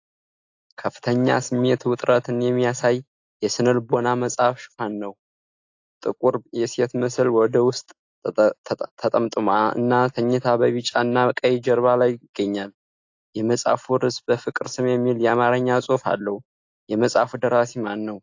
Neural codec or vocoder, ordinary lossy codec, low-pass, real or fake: none; AAC, 48 kbps; 7.2 kHz; real